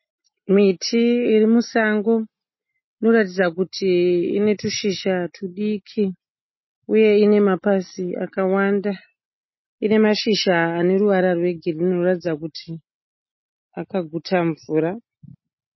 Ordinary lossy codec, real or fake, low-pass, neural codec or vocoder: MP3, 24 kbps; real; 7.2 kHz; none